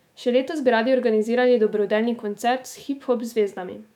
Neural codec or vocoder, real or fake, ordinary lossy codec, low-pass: autoencoder, 48 kHz, 128 numbers a frame, DAC-VAE, trained on Japanese speech; fake; none; 19.8 kHz